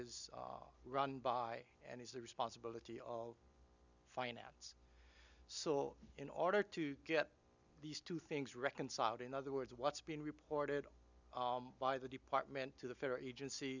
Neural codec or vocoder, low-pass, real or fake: none; 7.2 kHz; real